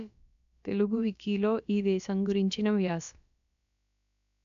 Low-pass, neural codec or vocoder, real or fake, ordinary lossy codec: 7.2 kHz; codec, 16 kHz, about 1 kbps, DyCAST, with the encoder's durations; fake; none